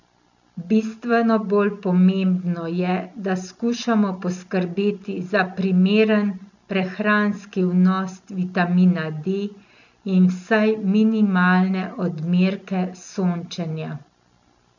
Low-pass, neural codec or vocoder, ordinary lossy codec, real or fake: 7.2 kHz; none; none; real